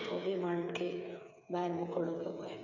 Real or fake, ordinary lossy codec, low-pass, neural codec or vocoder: fake; none; 7.2 kHz; codec, 16 kHz, 8 kbps, FreqCodec, smaller model